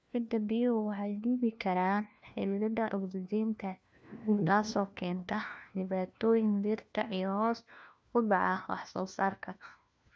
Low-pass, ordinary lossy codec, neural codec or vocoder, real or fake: none; none; codec, 16 kHz, 1 kbps, FunCodec, trained on LibriTTS, 50 frames a second; fake